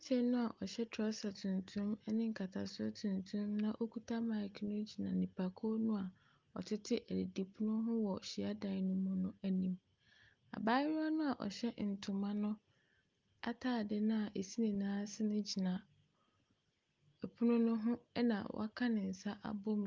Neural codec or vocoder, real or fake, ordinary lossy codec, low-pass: none; real; Opus, 32 kbps; 7.2 kHz